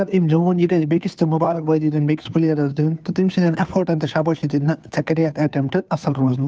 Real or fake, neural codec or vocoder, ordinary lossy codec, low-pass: fake; codec, 16 kHz, 2 kbps, FunCodec, trained on Chinese and English, 25 frames a second; none; none